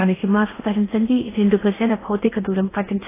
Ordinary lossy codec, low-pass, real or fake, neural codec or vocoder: AAC, 16 kbps; 3.6 kHz; fake; codec, 16 kHz in and 24 kHz out, 0.8 kbps, FocalCodec, streaming, 65536 codes